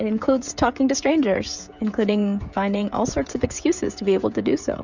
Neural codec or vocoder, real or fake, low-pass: codec, 16 kHz, 16 kbps, FreqCodec, smaller model; fake; 7.2 kHz